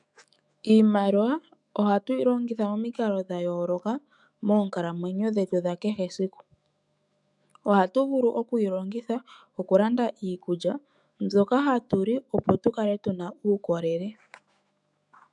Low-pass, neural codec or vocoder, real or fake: 10.8 kHz; autoencoder, 48 kHz, 128 numbers a frame, DAC-VAE, trained on Japanese speech; fake